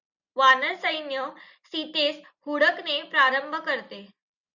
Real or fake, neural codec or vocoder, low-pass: real; none; 7.2 kHz